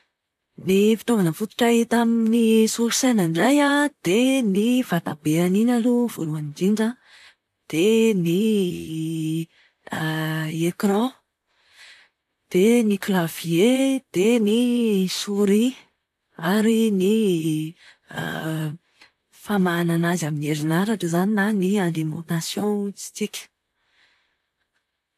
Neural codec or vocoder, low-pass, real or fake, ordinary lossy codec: vocoder, 44.1 kHz, 128 mel bands, Pupu-Vocoder; 14.4 kHz; fake; none